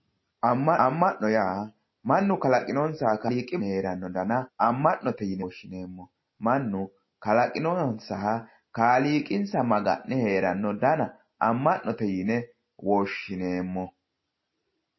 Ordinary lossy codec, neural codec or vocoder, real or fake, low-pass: MP3, 24 kbps; none; real; 7.2 kHz